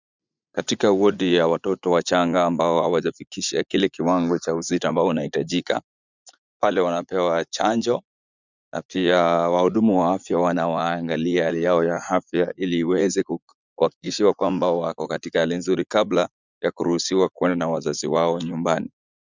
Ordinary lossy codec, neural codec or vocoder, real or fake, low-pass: Opus, 64 kbps; vocoder, 44.1 kHz, 80 mel bands, Vocos; fake; 7.2 kHz